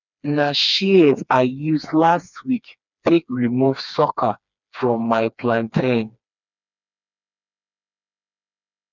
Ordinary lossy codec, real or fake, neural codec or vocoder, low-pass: none; fake; codec, 16 kHz, 2 kbps, FreqCodec, smaller model; 7.2 kHz